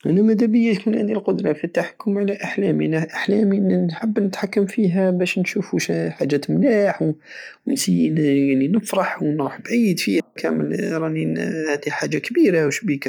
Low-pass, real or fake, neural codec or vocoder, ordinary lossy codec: 19.8 kHz; real; none; none